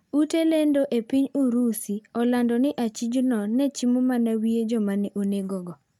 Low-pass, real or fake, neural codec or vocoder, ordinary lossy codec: 19.8 kHz; real; none; none